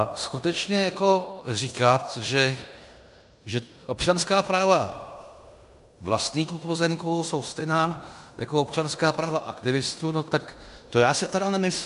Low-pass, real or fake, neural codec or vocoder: 10.8 kHz; fake; codec, 16 kHz in and 24 kHz out, 0.9 kbps, LongCat-Audio-Codec, fine tuned four codebook decoder